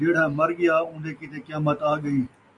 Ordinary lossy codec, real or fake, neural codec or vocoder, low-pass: MP3, 64 kbps; real; none; 10.8 kHz